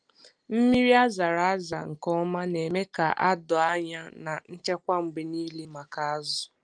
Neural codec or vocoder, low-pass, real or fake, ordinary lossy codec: none; 9.9 kHz; real; Opus, 32 kbps